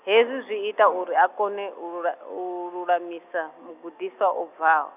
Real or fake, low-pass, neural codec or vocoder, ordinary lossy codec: real; 3.6 kHz; none; none